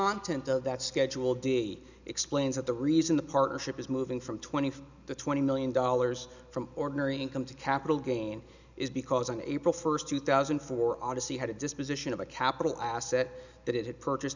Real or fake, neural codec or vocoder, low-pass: real; none; 7.2 kHz